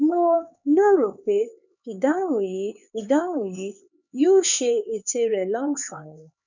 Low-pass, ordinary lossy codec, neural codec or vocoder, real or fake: 7.2 kHz; none; codec, 16 kHz, 4 kbps, X-Codec, HuBERT features, trained on LibriSpeech; fake